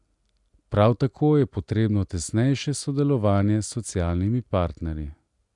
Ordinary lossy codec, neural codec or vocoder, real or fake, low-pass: none; none; real; 10.8 kHz